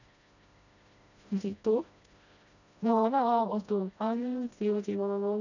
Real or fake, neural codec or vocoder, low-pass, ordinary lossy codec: fake; codec, 16 kHz, 1 kbps, FreqCodec, smaller model; 7.2 kHz; none